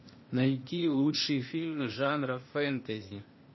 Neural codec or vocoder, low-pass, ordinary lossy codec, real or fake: codec, 16 kHz, 0.8 kbps, ZipCodec; 7.2 kHz; MP3, 24 kbps; fake